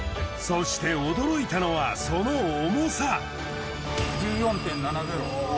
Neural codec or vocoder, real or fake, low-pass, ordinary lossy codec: none; real; none; none